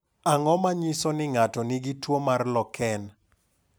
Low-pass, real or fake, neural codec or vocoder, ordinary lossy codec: none; real; none; none